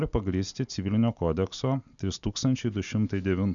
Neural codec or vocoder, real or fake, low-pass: none; real; 7.2 kHz